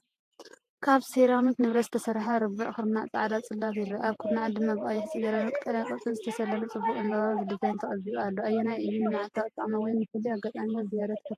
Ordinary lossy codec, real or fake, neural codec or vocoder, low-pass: AAC, 64 kbps; fake; vocoder, 48 kHz, 128 mel bands, Vocos; 14.4 kHz